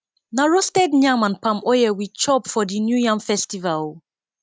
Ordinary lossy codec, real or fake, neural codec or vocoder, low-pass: none; real; none; none